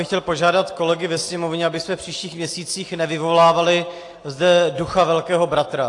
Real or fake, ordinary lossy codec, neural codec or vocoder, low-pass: real; AAC, 48 kbps; none; 10.8 kHz